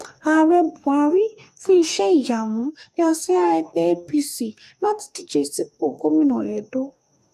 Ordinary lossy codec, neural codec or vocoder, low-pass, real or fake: AAC, 96 kbps; codec, 44.1 kHz, 2.6 kbps, DAC; 14.4 kHz; fake